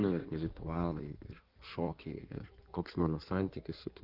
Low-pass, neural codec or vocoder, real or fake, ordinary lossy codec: 5.4 kHz; codec, 16 kHz in and 24 kHz out, 1.1 kbps, FireRedTTS-2 codec; fake; Opus, 32 kbps